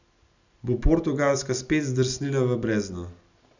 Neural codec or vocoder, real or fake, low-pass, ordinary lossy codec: none; real; 7.2 kHz; none